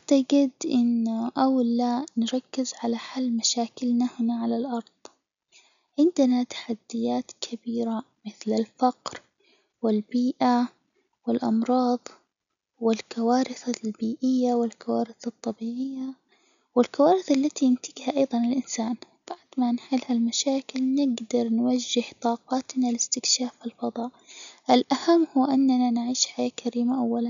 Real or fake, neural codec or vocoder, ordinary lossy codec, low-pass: real; none; none; 7.2 kHz